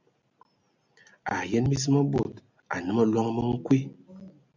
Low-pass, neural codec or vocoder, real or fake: 7.2 kHz; none; real